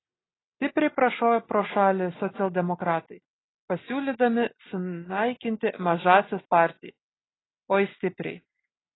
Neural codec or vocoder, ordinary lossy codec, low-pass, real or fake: none; AAC, 16 kbps; 7.2 kHz; real